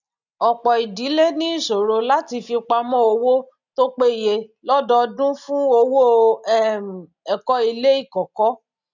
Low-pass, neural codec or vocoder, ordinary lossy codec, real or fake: 7.2 kHz; none; none; real